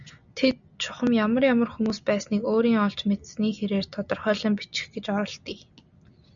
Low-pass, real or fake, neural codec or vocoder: 7.2 kHz; real; none